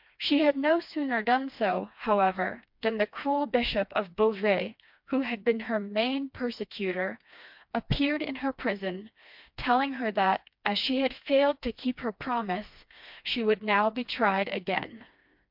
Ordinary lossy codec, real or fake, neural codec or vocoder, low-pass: MP3, 48 kbps; fake; codec, 16 kHz, 2 kbps, FreqCodec, smaller model; 5.4 kHz